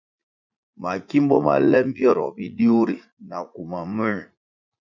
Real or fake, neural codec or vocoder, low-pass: fake; vocoder, 44.1 kHz, 80 mel bands, Vocos; 7.2 kHz